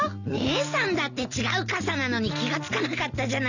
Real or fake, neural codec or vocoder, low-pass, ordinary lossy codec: real; none; 7.2 kHz; none